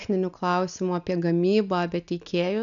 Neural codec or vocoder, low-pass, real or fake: none; 7.2 kHz; real